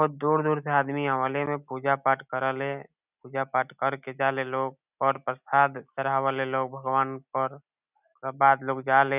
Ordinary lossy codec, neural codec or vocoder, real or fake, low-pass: none; none; real; 3.6 kHz